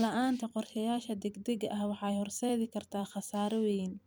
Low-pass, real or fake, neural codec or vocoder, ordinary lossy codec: none; fake; vocoder, 44.1 kHz, 128 mel bands every 256 samples, BigVGAN v2; none